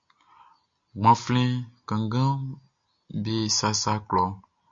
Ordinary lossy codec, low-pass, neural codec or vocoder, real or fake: MP3, 48 kbps; 7.2 kHz; none; real